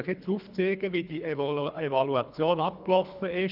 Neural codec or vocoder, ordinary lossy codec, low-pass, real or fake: codec, 24 kHz, 3 kbps, HILCodec; none; 5.4 kHz; fake